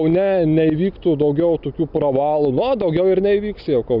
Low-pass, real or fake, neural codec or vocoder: 5.4 kHz; real; none